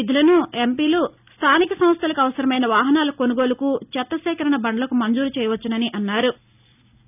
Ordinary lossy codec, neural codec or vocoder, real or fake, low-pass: none; none; real; 3.6 kHz